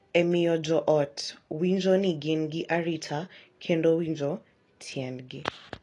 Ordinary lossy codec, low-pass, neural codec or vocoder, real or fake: AAC, 32 kbps; 10.8 kHz; none; real